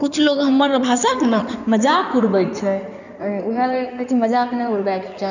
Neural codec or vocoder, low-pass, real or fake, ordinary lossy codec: codec, 16 kHz in and 24 kHz out, 2.2 kbps, FireRedTTS-2 codec; 7.2 kHz; fake; none